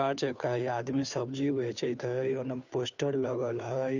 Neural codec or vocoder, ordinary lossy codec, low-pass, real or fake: codec, 16 kHz, 2 kbps, FunCodec, trained on Chinese and English, 25 frames a second; none; 7.2 kHz; fake